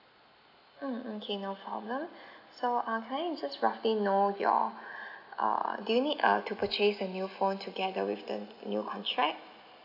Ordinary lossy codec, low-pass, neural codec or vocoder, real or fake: none; 5.4 kHz; none; real